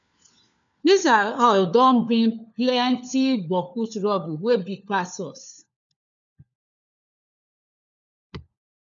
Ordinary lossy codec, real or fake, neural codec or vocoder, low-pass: MP3, 96 kbps; fake; codec, 16 kHz, 4 kbps, FunCodec, trained on LibriTTS, 50 frames a second; 7.2 kHz